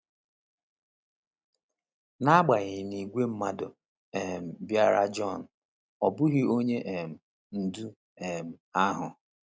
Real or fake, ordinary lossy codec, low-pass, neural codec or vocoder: real; none; none; none